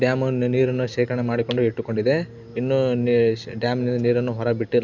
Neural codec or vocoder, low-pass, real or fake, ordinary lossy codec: none; 7.2 kHz; real; none